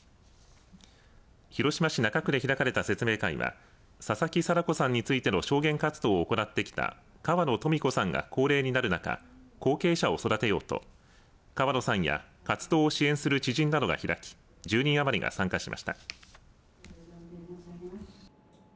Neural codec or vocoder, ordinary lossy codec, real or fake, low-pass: none; none; real; none